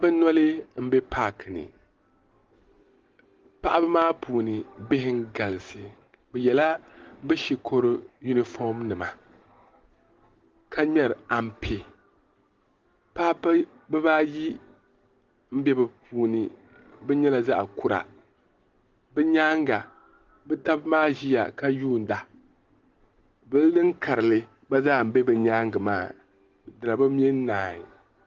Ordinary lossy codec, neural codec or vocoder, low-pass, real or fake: Opus, 16 kbps; none; 7.2 kHz; real